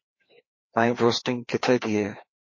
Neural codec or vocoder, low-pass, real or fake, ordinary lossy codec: codec, 16 kHz, 2 kbps, FreqCodec, larger model; 7.2 kHz; fake; MP3, 32 kbps